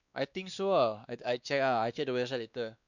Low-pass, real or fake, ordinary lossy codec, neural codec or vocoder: 7.2 kHz; fake; none; codec, 16 kHz, 2 kbps, X-Codec, WavLM features, trained on Multilingual LibriSpeech